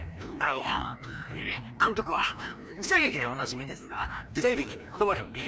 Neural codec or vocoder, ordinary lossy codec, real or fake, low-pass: codec, 16 kHz, 1 kbps, FreqCodec, larger model; none; fake; none